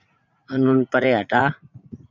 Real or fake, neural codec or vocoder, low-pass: fake; vocoder, 44.1 kHz, 80 mel bands, Vocos; 7.2 kHz